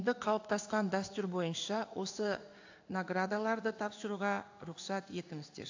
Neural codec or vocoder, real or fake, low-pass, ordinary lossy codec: codec, 16 kHz in and 24 kHz out, 1 kbps, XY-Tokenizer; fake; 7.2 kHz; AAC, 48 kbps